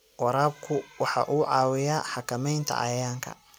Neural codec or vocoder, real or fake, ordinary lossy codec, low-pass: none; real; none; none